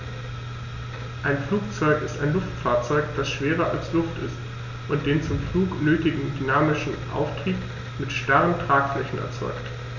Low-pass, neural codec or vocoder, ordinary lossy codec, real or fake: 7.2 kHz; none; none; real